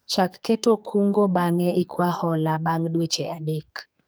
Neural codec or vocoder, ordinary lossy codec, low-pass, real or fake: codec, 44.1 kHz, 2.6 kbps, SNAC; none; none; fake